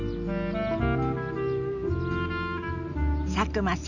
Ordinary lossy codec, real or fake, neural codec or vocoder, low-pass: none; real; none; 7.2 kHz